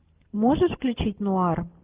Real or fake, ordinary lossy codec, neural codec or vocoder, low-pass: real; Opus, 32 kbps; none; 3.6 kHz